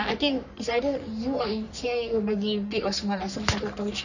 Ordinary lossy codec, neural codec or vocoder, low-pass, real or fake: none; codec, 44.1 kHz, 3.4 kbps, Pupu-Codec; 7.2 kHz; fake